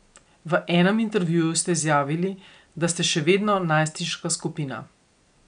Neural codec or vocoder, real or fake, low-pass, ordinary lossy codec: none; real; 9.9 kHz; none